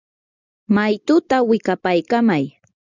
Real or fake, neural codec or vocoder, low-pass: real; none; 7.2 kHz